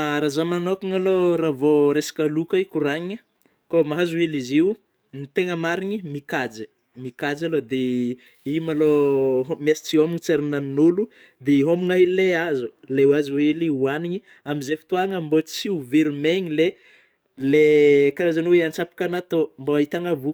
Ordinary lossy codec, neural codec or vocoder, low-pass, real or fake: none; codec, 44.1 kHz, 7.8 kbps, DAC; none; fake